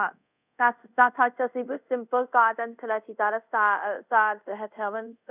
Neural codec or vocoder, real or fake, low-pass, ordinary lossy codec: codec, 24 kHz, 0.5 kbps, DualCodec; fake; 3.6 kHz; none